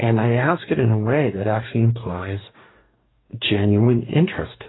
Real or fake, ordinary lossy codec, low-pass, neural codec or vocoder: fake; AAC, 16 kbps; 7.2 kHz; codec, 44.1 kHz, 2.6 kbps, DAC